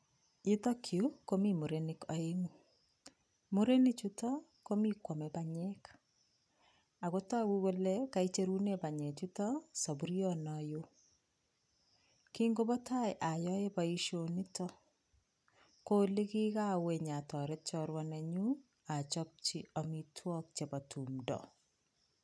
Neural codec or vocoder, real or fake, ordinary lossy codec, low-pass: none; real; none; none